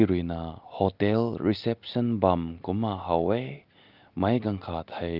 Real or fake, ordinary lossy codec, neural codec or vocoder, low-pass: real; Opus, 32 kbps; none; 5.4 kHz